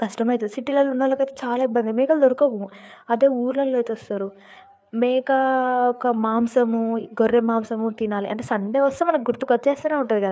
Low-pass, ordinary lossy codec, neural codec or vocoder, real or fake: none; none; codec, 16 kHz, 4 kbps, FreqCodec, larger model; fake